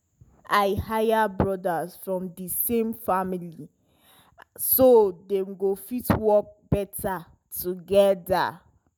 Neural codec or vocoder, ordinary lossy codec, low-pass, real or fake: none; none; none; real